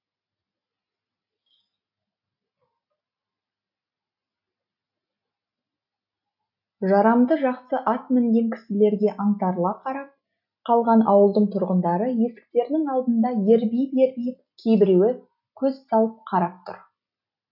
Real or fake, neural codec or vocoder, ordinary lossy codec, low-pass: real; none; none; 5.4 kHz